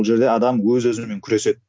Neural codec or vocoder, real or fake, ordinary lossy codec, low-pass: none; real; none; none